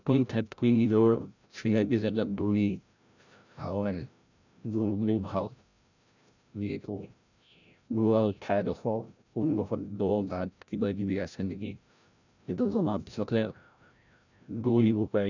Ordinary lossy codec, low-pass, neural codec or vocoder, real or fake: none; 7.2 kHz; codec, 16 kHz, 0.5 kbps, FreqCodec, larger model; fake